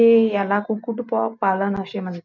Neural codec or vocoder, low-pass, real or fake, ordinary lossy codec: none; 7.2 kHz; real; none